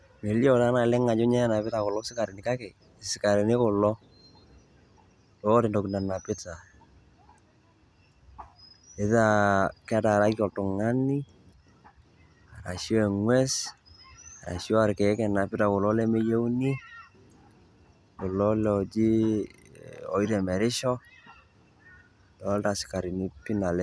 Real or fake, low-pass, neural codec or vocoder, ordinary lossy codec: real; none; none; none